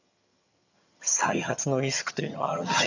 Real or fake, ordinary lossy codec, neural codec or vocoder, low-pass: fake; none; vocoder, 22.05 kHz, 80 mel bands, HiFi-GAN; 7.2 kHz